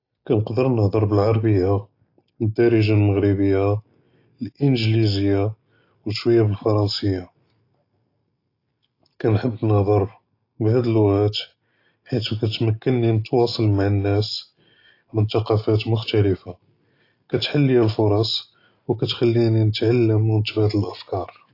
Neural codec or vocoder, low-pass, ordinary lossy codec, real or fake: none; 5.4 kHz; AAC, 32 kbps; real